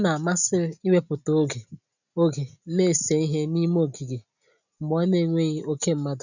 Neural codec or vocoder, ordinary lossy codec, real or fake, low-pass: none; none; real; 7.2 kHz